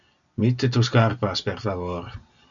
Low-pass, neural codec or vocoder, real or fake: 7.2 kHz; none; real